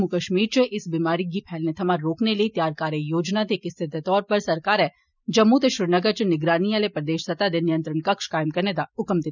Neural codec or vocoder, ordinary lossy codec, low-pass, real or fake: none; none; 7.2 kHz; real